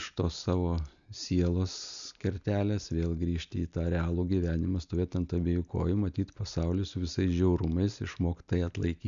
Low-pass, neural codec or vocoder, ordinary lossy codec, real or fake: 7.2 kHz; none; MP3, 96 kbps; real